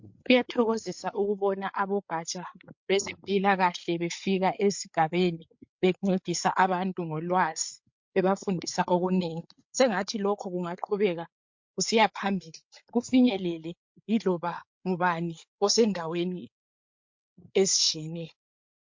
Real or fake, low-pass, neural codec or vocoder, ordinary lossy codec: fake; 7.2 kHz; codec, 16 kHz, 8 kbps, FreqCodec, larger model; MP3, 48 kbps